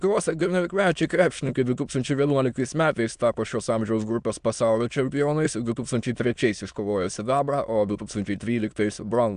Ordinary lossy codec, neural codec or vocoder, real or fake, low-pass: AAC, 96 kbps; autoencoder, 22.05 kHz, a latent of 192 numbers a frame, VITS, trained on many speakers; fake; 9.9 kHz